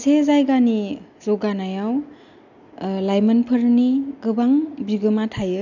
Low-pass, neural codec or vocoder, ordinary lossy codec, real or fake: 7.2 kHz; none; none; real